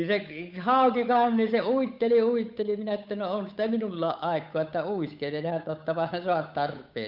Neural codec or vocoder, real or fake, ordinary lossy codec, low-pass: codec, 16 kHz, 8 kbps, FunCodec, trained on Chinese and English, 25 frames a second; fake; none; 5.4 kHz